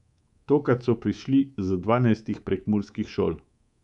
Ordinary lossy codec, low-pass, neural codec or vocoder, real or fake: none; 10.8 kHz; codec, 24 kHz, 3.1 kbps, DualCodec; fake